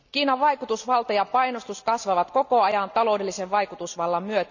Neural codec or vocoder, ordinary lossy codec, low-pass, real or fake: none; none; 7.2 kHz; real